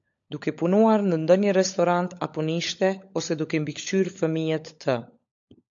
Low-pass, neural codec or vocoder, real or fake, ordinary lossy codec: 7.2 kHz; codec, 16 kHz, 16 kbps, FunCodec, trained on LibriTTS, 50 frames a second; fake; AAC, 64 kbps